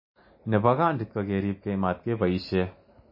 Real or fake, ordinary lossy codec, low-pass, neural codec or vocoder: real; MP3, 24 kbps; 5.4 kHz; none